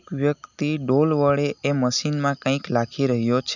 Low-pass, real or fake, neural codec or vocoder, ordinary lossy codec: 7.2 kHz; real; none; none